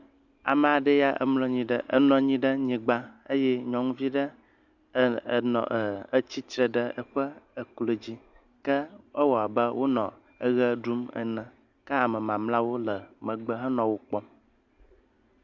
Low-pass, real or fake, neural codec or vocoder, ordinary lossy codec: 7.2 kHz; real; none; Opus, 64 kbps